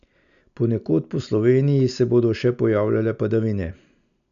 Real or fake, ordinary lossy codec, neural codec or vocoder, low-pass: real; none; none; 7.2 kHz